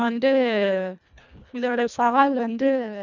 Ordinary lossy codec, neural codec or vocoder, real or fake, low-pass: none; codec, 24 kHz, 1.5 kbps, HILCodec; fake; 7.2 kHz